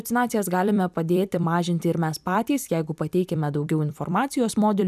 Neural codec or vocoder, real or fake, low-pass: vocoder, 44.1 kHz, 128 mel bands every 256 samples, BigVGAN v2; fake; 14.4 kHz